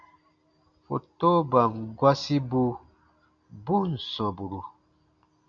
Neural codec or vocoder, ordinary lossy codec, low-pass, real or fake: none; MP3, 64 kbps; 7.2 kHz; real